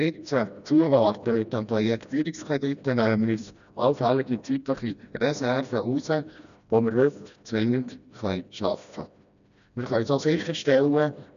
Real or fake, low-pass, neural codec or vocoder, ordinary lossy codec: fake; 7.2 kHz; codec, 16 kHz, 1 kbps, FreqCodec, smaller model; none